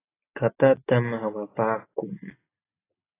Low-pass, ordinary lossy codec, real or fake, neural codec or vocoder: 3.6 kHz; AAC, 16 kbps; real; none